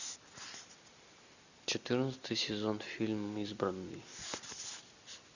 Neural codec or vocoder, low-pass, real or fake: none; 7.2 kHz; real